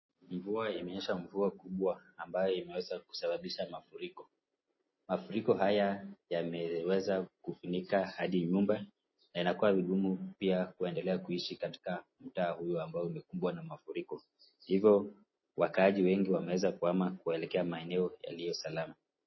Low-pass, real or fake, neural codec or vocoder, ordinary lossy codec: 7.2 kHz; real; none; MP3, 24 kbps